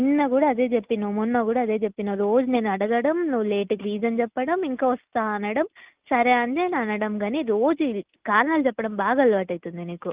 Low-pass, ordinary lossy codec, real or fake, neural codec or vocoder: 3.6 kHz; Opus, 32 kbps; real; none